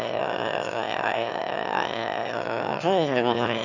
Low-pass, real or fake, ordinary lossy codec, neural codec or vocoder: 7.2 kHz; fake; none; autoencoder, 22.05 kHz, a latent of 192 numbers a frame, VITS, trained on one speaker